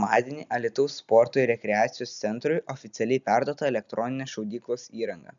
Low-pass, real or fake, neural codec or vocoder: 7.2 kHz; real; none